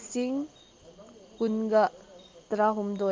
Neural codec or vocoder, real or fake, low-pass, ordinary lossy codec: none; real; 7.2 kHz; Opus, 32 kbps